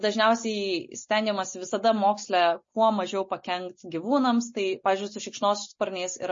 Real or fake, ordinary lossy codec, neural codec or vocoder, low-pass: real; MP3, 32 kbps; none; 7.2 kHz